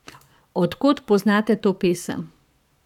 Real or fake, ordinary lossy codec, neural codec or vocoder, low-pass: fake; none; codec, 44.1 kHz, 7.8 kbps, Pupu-Codec; 19.8 kHz